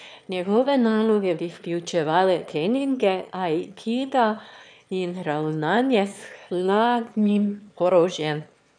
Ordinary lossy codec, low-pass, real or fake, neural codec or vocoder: none; 9.9 kHz; fake; autoencoder, 22.05 kHz, a latent of 192 numbers a frame, VITS, trained on one speaker